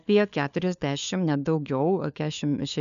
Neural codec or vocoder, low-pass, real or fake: codec, 16 kHz, 4 kbps, FunCodec, trained on LibriTTS, 50 frames a second; 7.2 kHz; fake